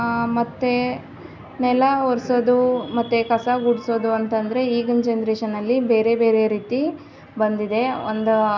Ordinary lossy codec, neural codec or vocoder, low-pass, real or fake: none; none; 7.2 kHz; real